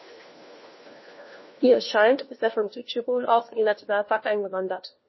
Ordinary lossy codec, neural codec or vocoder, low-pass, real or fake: MP3, 24 kbps; codec, 16 kHz, 1 kbps, FunCodec, trained on LibriTTS, 50 frames a second; 7.2 kHz; fake